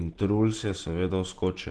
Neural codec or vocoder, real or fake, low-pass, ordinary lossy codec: none; real; 10.8 kHz; Opus, 16 kbps